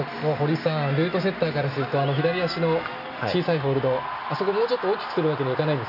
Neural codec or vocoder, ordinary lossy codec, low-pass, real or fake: none; none; 5.4 kHz; real